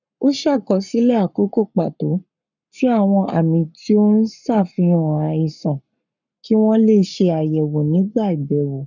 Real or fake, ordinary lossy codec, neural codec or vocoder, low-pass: fake; none; codec, 44.1 kHz, 7.8 kbps, Pupu-Codec; 7.2 kHz